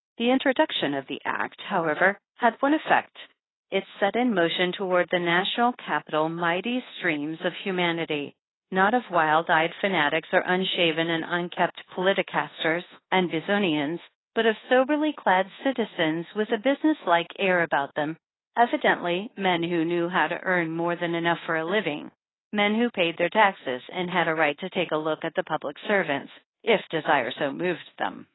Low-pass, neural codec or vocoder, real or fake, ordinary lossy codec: 7.2 kHz; codec, 24 kHz, 1.2 kbps, DualCodec; fake; AAC, 16 kbps